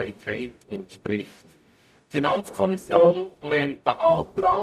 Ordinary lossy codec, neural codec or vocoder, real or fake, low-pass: none; codec, 44.1 kHz, 0.9 kbps, DAC; fake; 14.4 kHz